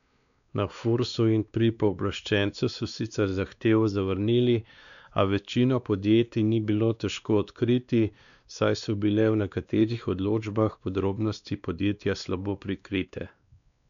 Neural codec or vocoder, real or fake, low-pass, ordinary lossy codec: codec, 16 kHz, 2 kbps, X-Codec, WavLM features, trained on Multilingual LibriSpeech; fake; 7.2 kHz; none